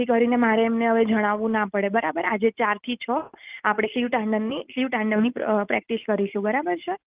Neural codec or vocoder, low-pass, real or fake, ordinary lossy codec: none; 3.6 kHz; real; Opus, 24 kbps